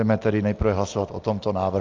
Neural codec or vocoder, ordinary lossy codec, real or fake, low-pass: none; Opus, 24 kbps; real; 7.2 kHz